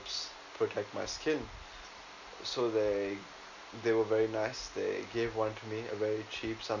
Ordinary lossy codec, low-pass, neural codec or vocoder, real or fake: none; 7.2 kHz; none; real